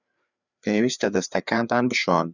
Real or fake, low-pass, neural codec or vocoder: fake; 7.2 kHz; codec, 16 kHz, 4 kbps, FreqCodec, larger model